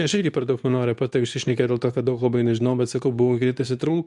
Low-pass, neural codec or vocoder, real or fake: 10.8 kHz; codec, 24 kHz, 0.9 kbps, WavTokenizer, medium speech release version 2; fake